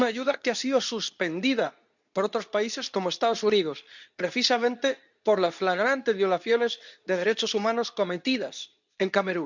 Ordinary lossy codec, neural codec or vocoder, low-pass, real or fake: none; codec, 24 kHz, 0.9 kbps, WavTokenizer, medium speech release version 2; 7.2 kHz; fake